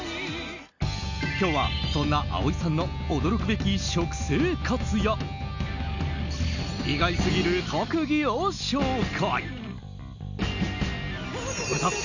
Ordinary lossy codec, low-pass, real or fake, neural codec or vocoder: none; 7.2 kHz; real; none